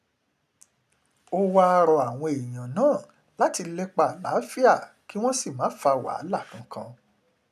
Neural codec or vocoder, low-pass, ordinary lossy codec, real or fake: none; 14.4 kHz; none; real